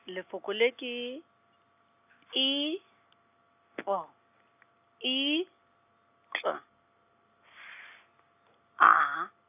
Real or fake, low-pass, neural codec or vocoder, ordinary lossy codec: real; 3.6 kHz; none; none